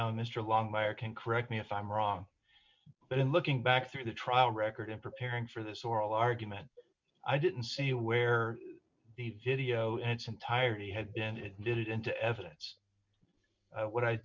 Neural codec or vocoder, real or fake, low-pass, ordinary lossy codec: none; real; 7.2 kHz; MP3, 64 kbps